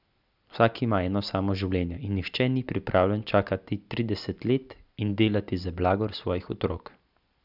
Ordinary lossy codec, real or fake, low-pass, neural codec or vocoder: none; real; 5.4 kHz; none